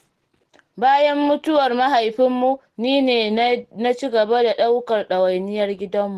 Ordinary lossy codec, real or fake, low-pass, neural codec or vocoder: Opus, 16 kbps; real; 14.4 kHz; none